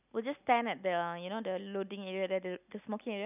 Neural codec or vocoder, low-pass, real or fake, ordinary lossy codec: none; 3.6 kHz; real; none